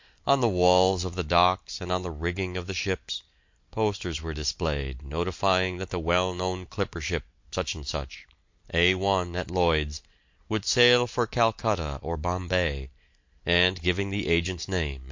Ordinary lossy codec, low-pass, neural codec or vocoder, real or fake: MP3, 48 kbps; 7.2 kHz; none; real